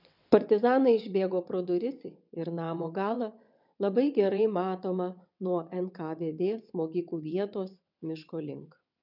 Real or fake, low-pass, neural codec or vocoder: fake; 5.4 kHz; vocoder, 22.05 kHz, 80 mel bands, WaveNeXt